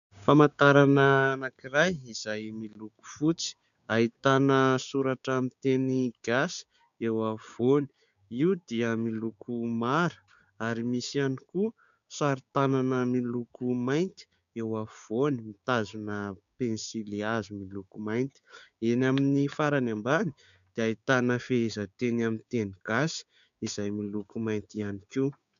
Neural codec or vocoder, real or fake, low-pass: codec, 16 kHz, 6 kbps, DAC; fake; 7.2 kHz